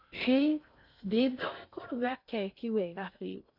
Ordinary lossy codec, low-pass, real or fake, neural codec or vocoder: none; 5.4 kHz; fake; codec, 16 kHz in and 24 kHz out, 0.6 kbps, FocalCodec, streaming, 2048 codes